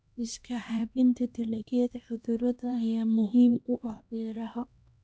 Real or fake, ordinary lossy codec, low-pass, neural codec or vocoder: fake; none; none; codec, 16 kHz, 1 kbps, X-Codec, HuBERT features, trained on LibriSpeech